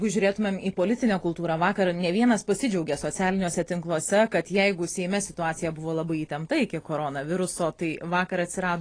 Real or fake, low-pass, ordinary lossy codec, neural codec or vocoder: real; 9.9 kHz; AAC, 32 kbps; none